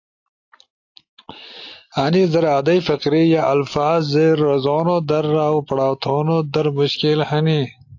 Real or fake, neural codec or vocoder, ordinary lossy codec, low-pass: real; none; AAC, 48 kbps; 7.2 kHz